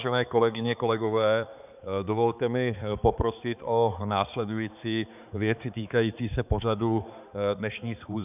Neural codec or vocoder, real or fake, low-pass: codec, 16 kHz, 4 kbps, X-Codec, HuBERT features, trained on balanced general audio; fake; 3.6 kHz